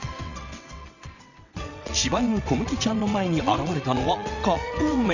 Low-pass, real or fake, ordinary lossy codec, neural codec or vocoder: 7.2 kHz; fake; none; vocoder, 22.05 kHz, 80 mel bands, WaveNeXt